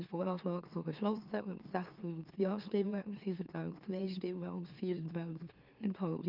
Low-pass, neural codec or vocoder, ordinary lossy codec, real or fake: 5.4 kHz; autoencoder, 44.1 kHz, a latent of 192 numbers a frame, MeloTTS; Opus, 24 kbps; fake